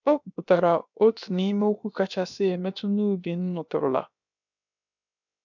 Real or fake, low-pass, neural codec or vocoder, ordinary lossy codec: fake; 7.2 kHz; codec, 16 kHz, about 1 kbps, DyCAST, with the encoder's durations; none